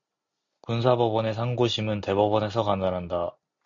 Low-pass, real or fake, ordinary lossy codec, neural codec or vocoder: 7.2 kHz; real; MP3, 48 kbps; none